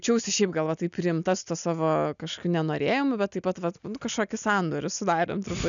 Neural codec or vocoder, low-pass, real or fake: none; 7.2 kHz; real